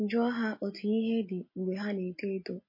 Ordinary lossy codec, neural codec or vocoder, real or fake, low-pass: MP3, 24 kbps; none; real; 5.4 kHz